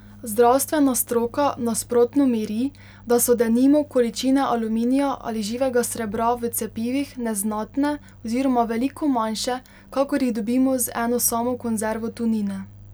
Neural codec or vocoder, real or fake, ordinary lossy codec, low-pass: none; real; none; none